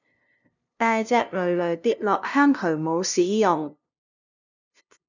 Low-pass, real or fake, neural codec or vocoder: 7.2 kHz; fake; codec, 16 kHz, 0.5 kbps, FunCodec, trained on LibriTTS, 25 frames a second